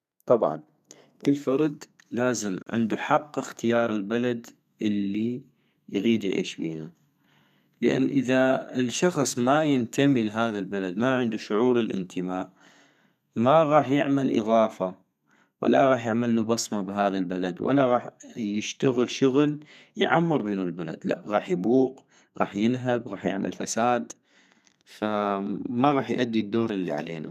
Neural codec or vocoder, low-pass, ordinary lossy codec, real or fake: codec, 32 kHz, 1.9 kbps, SNAC; 14.4 kHz; none; fake